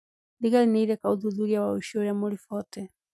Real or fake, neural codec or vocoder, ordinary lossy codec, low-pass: real; none; none; none